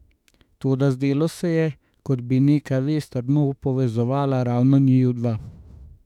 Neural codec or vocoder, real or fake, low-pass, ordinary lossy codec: autoencoder, 48 kHz, 32 numbers a frame, DAC-VAE, trained on Japanese speech; fake; 19.8 kHz; none